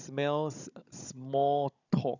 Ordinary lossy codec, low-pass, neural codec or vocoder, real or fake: none; 7.2 kHz; codec, 16 kHz, 16 kbps, FunCodec, trained on Chinese and English, 50 frames a second; fake